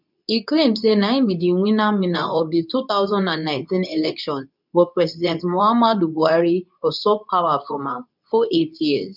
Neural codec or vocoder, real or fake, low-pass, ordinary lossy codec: codec, 24 kHz, 0.9 kbps, WavTokenizer, medium speech release version 2; fake; 5.4 kHz; none